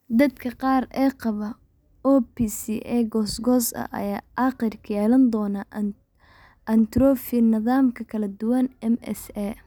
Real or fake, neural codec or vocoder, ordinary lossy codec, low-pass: real; none; none; none